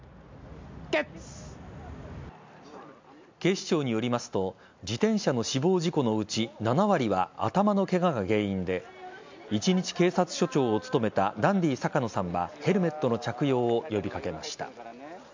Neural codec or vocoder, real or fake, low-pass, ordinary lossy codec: none; real; 7.2 kHz; MP3, 64 kbps